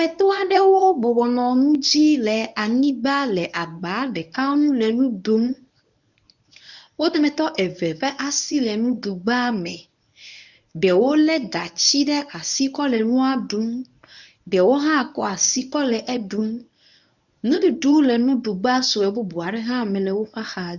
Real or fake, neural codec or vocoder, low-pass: fake; codec, 24 kHz, 0.9 kbps, WavTokenizer, medium speech release version 1; 7.2 kHz